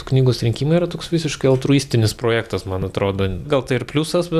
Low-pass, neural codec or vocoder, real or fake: 14.4 kHz; none; real